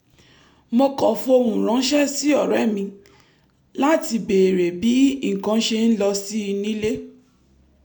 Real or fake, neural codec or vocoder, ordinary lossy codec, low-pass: real; none; none; none